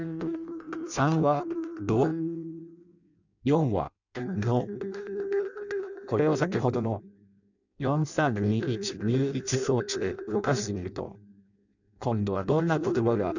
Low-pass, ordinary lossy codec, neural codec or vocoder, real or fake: 7.2 kHz; none; codec, 16 kHz in and 24 kHz out, 0.6 kbps, FireRedTTS-2 codec; fake